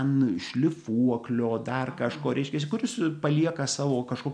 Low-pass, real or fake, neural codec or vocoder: 9.9 kHz; real; none